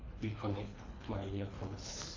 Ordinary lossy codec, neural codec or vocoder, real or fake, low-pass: AAC, 32 kbps; codec, 24 kHz, 3 kbps, HILCodec; fake; 7.2 kHz